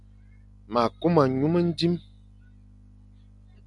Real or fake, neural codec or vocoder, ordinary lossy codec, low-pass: real; none; MP3, 96 kbps; 10.8 kHz